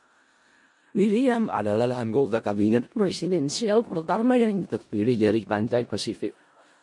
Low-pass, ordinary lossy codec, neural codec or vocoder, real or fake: 10.8 kHz; MP3, 48 kbps; codec, 16 kHz in and 24 kHz out, 0.4 kbps, LongCat-Audio-Codec, four codebook decoder; fake